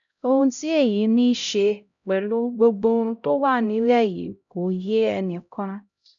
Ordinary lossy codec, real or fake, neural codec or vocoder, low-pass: MP3, 96 kbps; fake; codec, 16 kHz, 0.5 kbps, X-Codec, HuBERT features, trained on LibriSpeech; 7.2 kHz